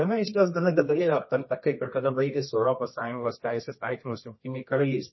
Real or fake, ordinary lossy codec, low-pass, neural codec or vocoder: fake; MP3, 24 kbps; 7.2 kHz; codec, 24 kHz, 0.9 kbps, WavTokenizer, medium music audio release